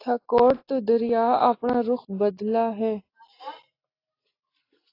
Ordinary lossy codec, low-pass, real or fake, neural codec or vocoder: AAC, 24 kbps; 5.4 kHz; real; none